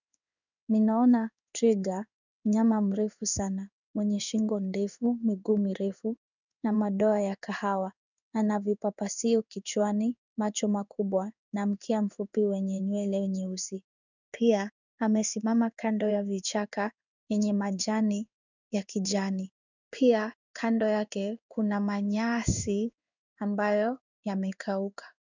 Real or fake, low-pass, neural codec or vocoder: fake; 7.2 kHz; codec, 16 kHz in and 24 kHz out, 1 kbps, XY-Tokenizer